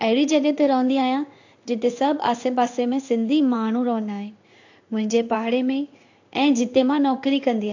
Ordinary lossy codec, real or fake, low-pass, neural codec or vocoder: AAC, 48 kbps; fake; 7.2 kHz; codec, 16 kHz in and 24 kHz out, 1 kbps, XY-Tokenizer